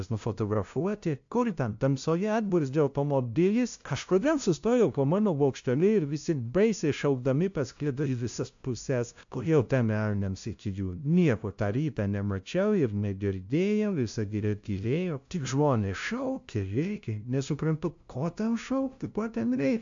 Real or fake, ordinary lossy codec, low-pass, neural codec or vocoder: fake; AAC, 64 kbps; 7.2 kHz; codec, 16 kHz, 0.5 kbps, FunCodec, trained on LibriTTS, 25 frames a second